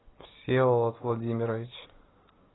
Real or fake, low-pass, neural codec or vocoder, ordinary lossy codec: real; 7.2 kHz; none; AAC, 16 kbps